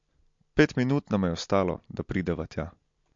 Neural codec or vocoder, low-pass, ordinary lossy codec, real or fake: none; 7.2 kHz; MP3, 48 kbps; real